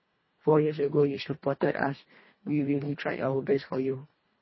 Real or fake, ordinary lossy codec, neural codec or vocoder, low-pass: fake; MP3, 24 kbps; codec, 24 kHz, 1.5 kbps, HILCodec; 7.2 kHz